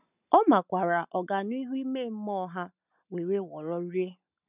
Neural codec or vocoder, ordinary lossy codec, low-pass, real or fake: none; none; 3.6 kHz; real